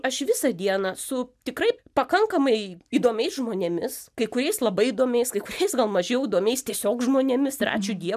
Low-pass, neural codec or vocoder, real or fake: 14.4 kHz; none; real